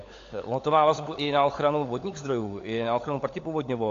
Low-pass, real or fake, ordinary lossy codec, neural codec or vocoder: 7.2 kHz; fake; AAC, 48 kbps; codec, 16 kHz, 16 kbps, FunCodec, trained on LibriTTS, 50 frames a second